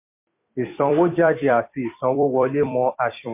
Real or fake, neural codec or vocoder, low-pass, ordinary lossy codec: fake; vocoder, 44.1 kHz, 128 mel bands every 256 samples, BigVGAN v2; 3.6 kHz; MP3, 24 kbps